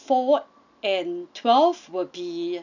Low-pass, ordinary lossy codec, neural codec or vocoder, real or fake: 7.2 kHz; none; none; real